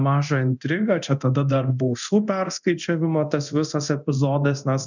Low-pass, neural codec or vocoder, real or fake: 7.2 kHz; codec, 24 kHz, 0.9 kbps, DualCodec; fake